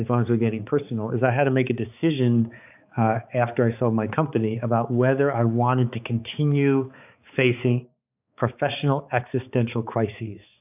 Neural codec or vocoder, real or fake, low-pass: codec, 16 kHz, 4 kbps, X-Codec, HuBERT features, trained on balanced general audio; fake; 3.6 kHz